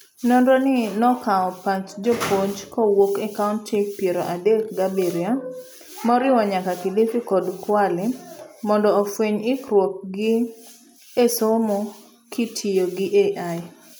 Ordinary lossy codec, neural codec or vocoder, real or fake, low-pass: none; none; real; none